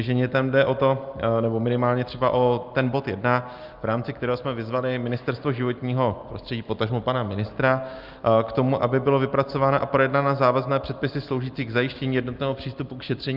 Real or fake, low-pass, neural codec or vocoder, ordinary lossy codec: real; 5.4 kHz; none; Opus, 24 kbps